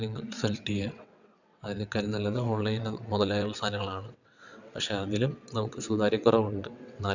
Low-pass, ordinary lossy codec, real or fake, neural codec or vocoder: 7.2 kHz; none; fake; vocoder, 22.05 kHz, 80 mel bands, WaveNeXt